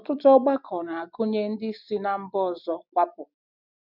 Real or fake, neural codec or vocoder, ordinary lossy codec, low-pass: real; none; none; 5.4 kHz